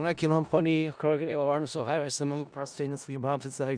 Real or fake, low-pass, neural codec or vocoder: fake; 9.9 kHz; codec, 16 kHz in and 24 kHz out, 0.4 kbps, LongCat-Audio-Codec, four codebook decoder